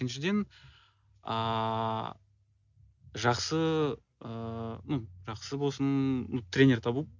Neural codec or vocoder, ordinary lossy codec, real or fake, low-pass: none; none; real; 7.2 kHz